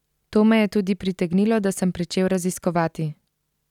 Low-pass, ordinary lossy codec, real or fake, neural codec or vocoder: 19.8 kHz; none; real; none